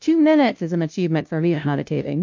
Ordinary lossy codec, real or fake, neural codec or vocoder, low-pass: MP3, 48 kbps; fake; codec, 16 kHz, 0.5 kbps, FunCodec, trained on LibriTTS, 25 frames a second; 7.2 kHz